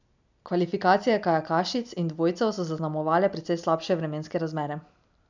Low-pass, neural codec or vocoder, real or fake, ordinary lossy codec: 7.2 kHz; none; real; none